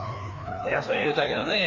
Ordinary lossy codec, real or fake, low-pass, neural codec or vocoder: AAC, 32 kbps; fake; 7.2 kHz; codec, 16 kHz, 2 kbps, FreqCodec, larger model